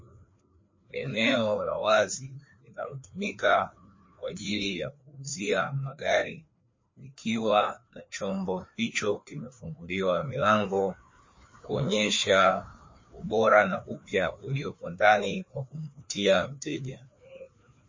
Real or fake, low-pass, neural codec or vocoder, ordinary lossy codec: fake; 7.2 kHz; codec, 16 kHz, 2 kbps, FreqCodec, larger model; MP3, 32 kbps